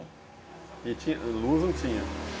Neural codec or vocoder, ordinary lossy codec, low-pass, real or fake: none; none; none; real